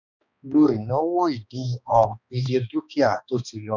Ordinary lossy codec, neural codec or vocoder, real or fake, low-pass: none; codec, 16 kHz, 2 kbps, X-Codec, HuBERT features, trained on balanced general audio; fake; 7.2 kHz